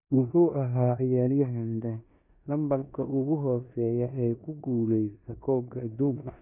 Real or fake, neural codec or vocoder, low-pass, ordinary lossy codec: fake; codec, 16 kHz in and 24 kHz out, 0.9 kbps, LongCat-Audio-Codec, four codebook decoder; 3.6 kHz; none